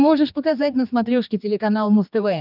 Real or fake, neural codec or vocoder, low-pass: fake; codec, 16 kHz, 2 kbps, X-Codec, HuBERT features, trained on general audio; 5.4 kHz